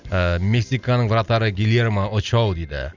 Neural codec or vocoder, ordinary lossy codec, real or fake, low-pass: none; Opus, 64 kbps; real; 7.2 kHz